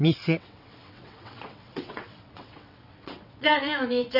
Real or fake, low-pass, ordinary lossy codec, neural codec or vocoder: fake; 5.4 kHz; none; vocoder, 22.05 kHz, 80 mel bands, WaveNeXt